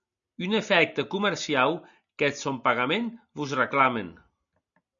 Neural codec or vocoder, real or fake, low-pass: none; real; 7.2 kHz